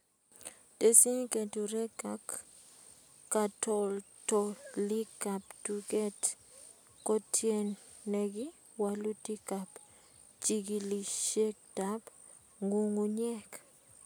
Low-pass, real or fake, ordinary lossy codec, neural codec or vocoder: none; real; none; none